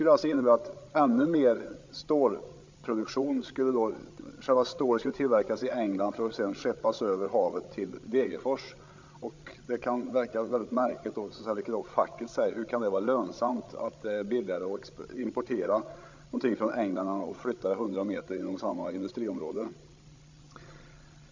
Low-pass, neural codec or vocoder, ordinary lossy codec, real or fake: 7.2 kHz; codec, 16 kHz, 16 kbps, FreqCodec, larger model; MP3, 64 kbps; fake